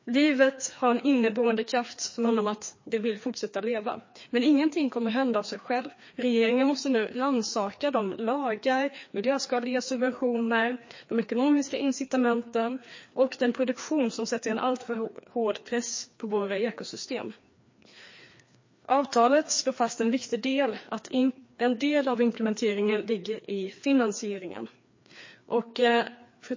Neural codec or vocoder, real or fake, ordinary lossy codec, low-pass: codec, 16 kHz, 2 kbps, FreqCodec, larger model; fake; MP3, 32 kbps; 7.2 kHz